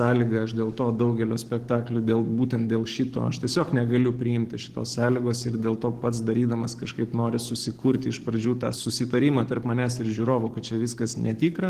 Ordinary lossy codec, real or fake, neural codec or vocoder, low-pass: Opus, 16 kbps; fake; codec, 44.1 kHz, 7.8 kbps, DAC; 14.4 kHz